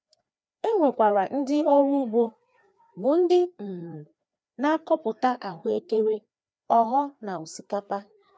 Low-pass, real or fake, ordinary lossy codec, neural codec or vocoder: none; fake; none; codec, 16 kHz, 2 kbps, FreqCodec, larger model